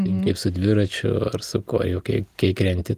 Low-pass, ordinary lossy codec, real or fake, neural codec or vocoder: 14.4 kHz; Opus, 24 kbps; real; none